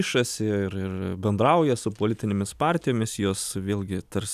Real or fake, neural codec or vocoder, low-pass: real; none; 14.4 kHz